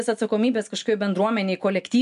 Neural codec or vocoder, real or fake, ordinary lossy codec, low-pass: none; real; AAC, 96 kbps; 10.8 kHz